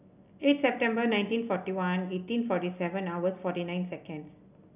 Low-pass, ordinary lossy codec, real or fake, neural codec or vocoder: 3.6 kHz; none; real; none